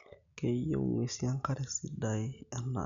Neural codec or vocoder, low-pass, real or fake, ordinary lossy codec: none; 7.2 kHz; real; none